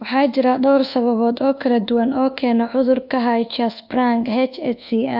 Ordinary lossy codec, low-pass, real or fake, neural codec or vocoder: AAC, 48 kbps; 5.4 kHz; fake; codec, 24 kHz, 1.2 kbps, DualCodec